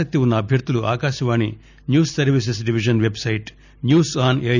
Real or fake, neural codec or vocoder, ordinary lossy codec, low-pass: real; none; none; 7.2 kHz